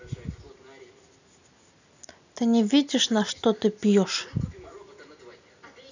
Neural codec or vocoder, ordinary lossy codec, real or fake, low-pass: none; none; real; 7.2 kHz